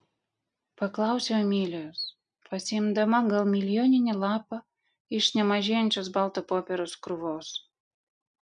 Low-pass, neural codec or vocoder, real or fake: 9.9 kHz; none; real